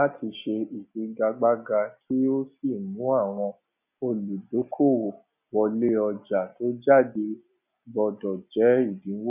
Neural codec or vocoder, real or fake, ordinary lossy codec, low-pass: none; real; none; 3.6 kHz